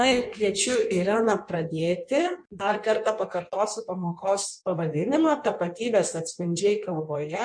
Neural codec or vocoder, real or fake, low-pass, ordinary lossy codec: codec, 16 kHz in and 24 kHz out, 1.1 kbps, FireRedTTS-2 codec; fake; 9.9 kHz; MP3, 64 kbps